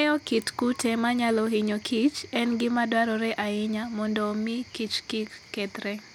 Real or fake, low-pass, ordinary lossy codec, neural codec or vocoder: real; 19.8 kHz; none; none